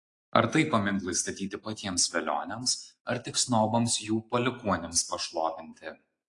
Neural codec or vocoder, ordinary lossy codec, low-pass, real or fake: none; AAC, 48 kbps; 10.8 kHz; real